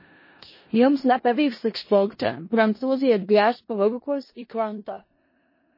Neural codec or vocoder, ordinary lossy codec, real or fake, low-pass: codec, 16 kHz in and 24 kHz out, 0.4 kbps, LongCat-Audio-Codec, four codebook decoder; MP3, 24 kbps; fake; 5.4 kHz